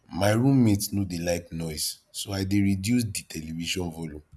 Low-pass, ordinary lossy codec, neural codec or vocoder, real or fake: none; none; none; real